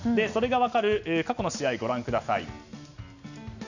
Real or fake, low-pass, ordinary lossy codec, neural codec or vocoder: real; 7.2 kHz; none; none